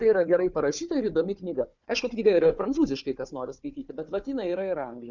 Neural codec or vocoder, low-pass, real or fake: codec, 16 kHz, 4 kbps, FunCodec, trained on Chinese and English, 50 frames a second; 7.2 kHz; fake